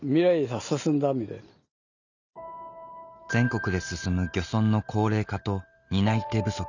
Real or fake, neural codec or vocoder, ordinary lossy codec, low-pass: real; none; none; 7.2 kHz